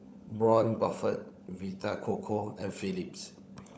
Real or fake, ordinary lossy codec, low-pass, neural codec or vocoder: fake; none; none; codec, 16 kHz, 16 kbps, FunCodec, trained on LibriTTS, 50 frames a second